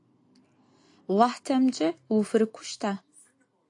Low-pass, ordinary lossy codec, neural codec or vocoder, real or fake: 10.8 kHz; AAC, 48 kbps; none; real